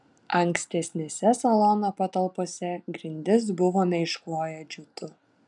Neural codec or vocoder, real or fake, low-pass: none; real; 10.8 kHz